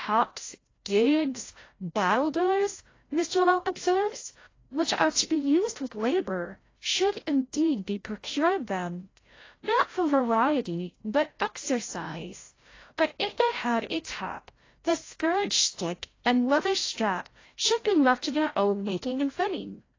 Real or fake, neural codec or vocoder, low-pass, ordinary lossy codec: fake; codec, 16 kHz, 0.5 kbps, FreqCodec, larger model; 7.2 kHz; AAC, 32 kbps